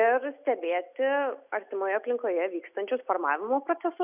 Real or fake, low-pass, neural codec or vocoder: real; 3.6 kHz; none